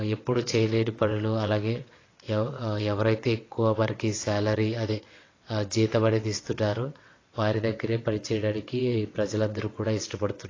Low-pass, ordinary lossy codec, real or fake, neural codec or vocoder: 7.2 kHz; AAC, 32 kbps; fake; vocoder, 22.05 kHz, 80 mel bands, WaveNeXt